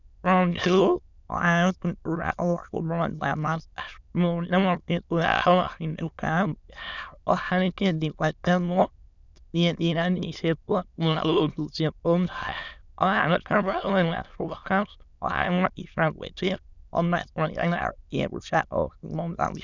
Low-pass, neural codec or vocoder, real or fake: 7.2 kHz; autoencoder, 22.05 kHz, a latent of 192 numbers a frame, VITS, trained on many speakers; fake